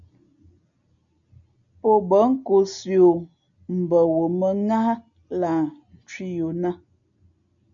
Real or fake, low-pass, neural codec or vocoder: real; 7.2 kHz; none